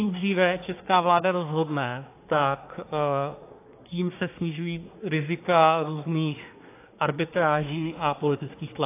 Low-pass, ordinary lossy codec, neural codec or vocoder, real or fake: 3.6 kHz; AAC, 24 kbps; codec, 24 kHz, 1 kbps, SNAC; fake